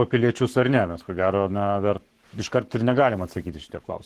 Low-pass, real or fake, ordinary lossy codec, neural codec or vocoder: 14.4 kHz; fake; Opus, 16 kbps; codec, 44.1 kHz, 7.8 kbps, Pupu-Codec